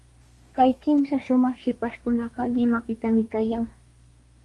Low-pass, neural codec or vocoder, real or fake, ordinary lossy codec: 10.8 kHz; codec, 24 kHz, 1 kbps, SNAC; fake; Opus, 32 kbps